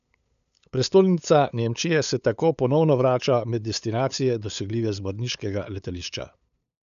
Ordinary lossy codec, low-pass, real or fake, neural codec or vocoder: none; 7.2 kHz; fake; codec, 16 kHz, 8 kbps, FunCodec, trained on LibriTTS, 25 frames a second